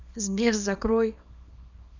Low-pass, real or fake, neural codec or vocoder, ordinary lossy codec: 7.2 kHz; fake; codec, 24 kHz, 0.9 kbps, WavTokenizer, small release; none